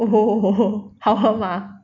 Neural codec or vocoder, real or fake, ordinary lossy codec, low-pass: none; real; none; 7.2 kHz